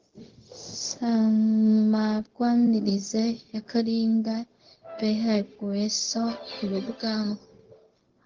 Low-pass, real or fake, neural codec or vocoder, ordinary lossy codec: 7.2 kHz; fake; codec, 16 kHz, 0.4 kbps, LongCat-Audio-Codec; Opus, 16 kbps